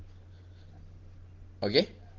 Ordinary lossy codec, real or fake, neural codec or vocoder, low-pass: Opus, 16 kbps; real; none; 7.2 kHz